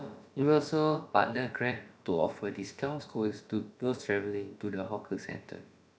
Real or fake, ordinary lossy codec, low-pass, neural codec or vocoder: fake; none; none; codec, 16 kHz, about 1 kbps, DyCAST, with the encoder's durations